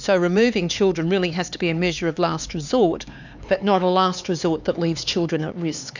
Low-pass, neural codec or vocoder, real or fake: 7.2 kHz; codec, 16 kHz, 4 kbps, X-Codec, HuBERT features, trained on LibriSpeech; fake